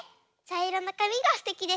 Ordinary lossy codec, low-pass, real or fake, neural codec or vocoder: none; none; real; none